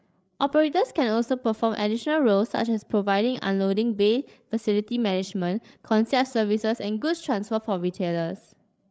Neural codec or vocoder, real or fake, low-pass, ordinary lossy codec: codec, 16 kHz, 8 kbps, FreqCodec, larger model; fake; none; none